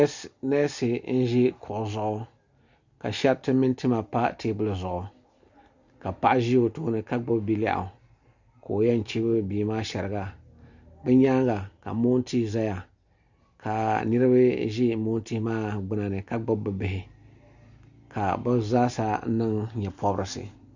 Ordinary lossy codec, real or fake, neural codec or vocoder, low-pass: Opus, 64 kbps; real; none; 7.2 kHz